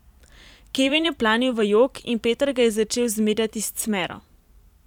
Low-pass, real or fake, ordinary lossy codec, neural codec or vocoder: 19.8 kHz; fake; none; vocoder, 44.1 kHz, 128 mel bands every 512 samples, BigVGAN v2